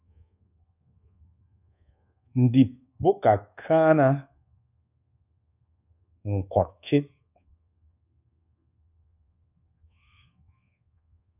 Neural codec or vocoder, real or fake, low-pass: codec, 24 kHz, 1.2 kbps, DualCodec; fake; 3.6 kHz